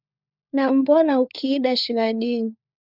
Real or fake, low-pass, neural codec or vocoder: fake; 5.4 kHz; codec, 16 kHz, 4 kbps, FunCodec, trained on LibriTTS, 50 frames a second